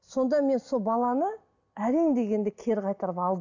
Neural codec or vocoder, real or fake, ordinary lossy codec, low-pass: none; real; none; 7.2 kHz